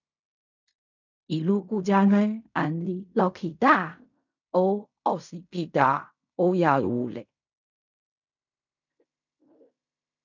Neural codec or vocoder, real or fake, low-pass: codec, 16 kHz in and 24 kHz out, 0.4 kbps, LongCat-Audio-Codec, fine tuned four codebook decoder; fake; 7.2 kHz